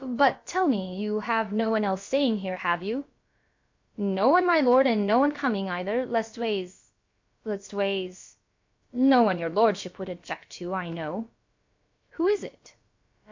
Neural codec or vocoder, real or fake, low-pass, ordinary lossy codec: codec, 16 kHz, about 1 kbps, DyCAST, with the encoder's durations; fake; 7.2 kHz; MP3, 48 kbps